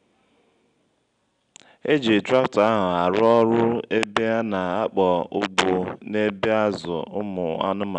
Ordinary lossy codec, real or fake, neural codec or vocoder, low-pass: none; real; none; 9.9 kHz